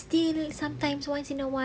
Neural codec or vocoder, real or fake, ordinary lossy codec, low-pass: none; real; none; none